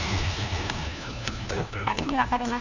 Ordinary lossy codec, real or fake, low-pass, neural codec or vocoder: none; fake; 7.2 kHz; codec, 16 kHz, 1 kbps, FunCodec, trained on LibriTTS, 50 frames a second